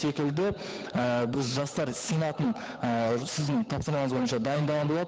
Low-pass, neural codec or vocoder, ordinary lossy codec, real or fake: none; codec, 16 kHz, 8 kbps, FunCodec, trained on Chinese and English, 25 frames a second; none; fake